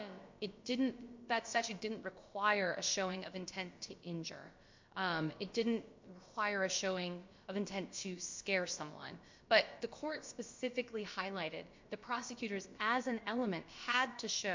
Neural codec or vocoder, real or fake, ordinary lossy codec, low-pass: codec, 16 kHz, about 1 kbps, DyCAST, with the encoder's durations; fake; MP3, 48 kbps; 7.2 kHz